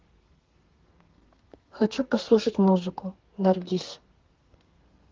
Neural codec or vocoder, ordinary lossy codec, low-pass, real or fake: codec, 32 kHz, 1.9 kbps, SNAC; Opus, 16 kbps; 7.2 kHz; fake